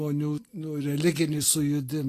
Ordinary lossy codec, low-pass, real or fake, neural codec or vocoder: AAC, 48 kbps; 14.4 kHz; real; none